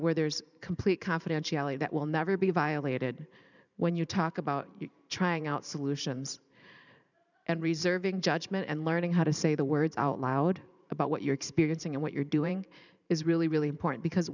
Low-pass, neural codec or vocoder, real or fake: 7.2 kHz; vocoder, 44.1 kHz, 128 mel bands every 512 samples, BigVGAN v2; fake